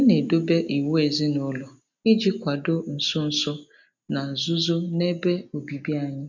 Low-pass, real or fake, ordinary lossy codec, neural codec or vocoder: 7.2 kHz; real; none; none